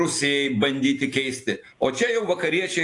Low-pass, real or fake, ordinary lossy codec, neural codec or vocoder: 10.8 kHz; real; AAC, 48 kbps; none